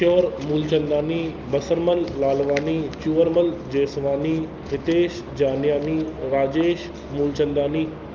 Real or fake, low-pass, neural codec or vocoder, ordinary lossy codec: real; 7.2 kHz; none; Opus, 24 kbps